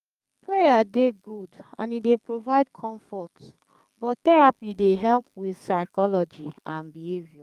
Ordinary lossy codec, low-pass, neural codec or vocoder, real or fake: Opus, 32 kbps; 14.4 kHz; codec, 32 kHz, 1.9 kbps, SNAC; fake